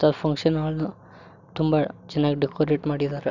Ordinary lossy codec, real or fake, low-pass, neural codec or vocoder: none; real; 7.2 kHz; none